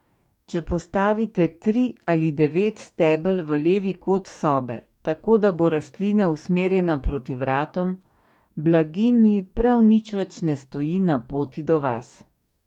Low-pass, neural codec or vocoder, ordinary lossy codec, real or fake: 19.8 kHz; codec, 44.1 kHz, 2.6 kbps, DAC; none; fake